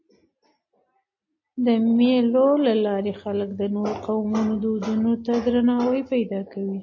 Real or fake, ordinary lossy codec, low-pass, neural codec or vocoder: real; MP3, 32 kbps; 7.2 kHz; none